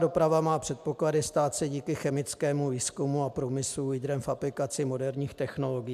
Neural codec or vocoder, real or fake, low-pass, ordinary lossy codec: none; real; 14.4 kHz; AAC, 96 kbps